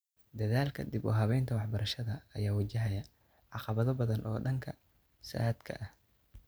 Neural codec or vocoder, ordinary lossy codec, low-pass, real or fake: none; none; none; real